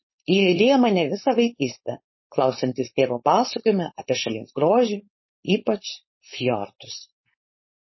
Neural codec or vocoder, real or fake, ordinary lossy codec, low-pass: codec, 16 kHz, 4.8 kbps, FACodec; fake; MP3, 24 kbps; 7.2 kHz